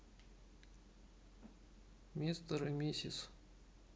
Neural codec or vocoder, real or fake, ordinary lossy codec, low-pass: none; real; none; none